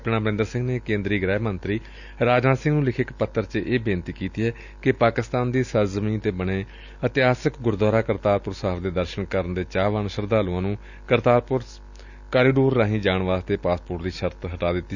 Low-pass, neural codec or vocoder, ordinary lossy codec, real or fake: 7.2 kHz; none; none; real